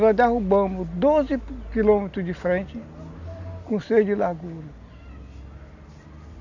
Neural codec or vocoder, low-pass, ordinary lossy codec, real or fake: none; 7.2 kHz; none; real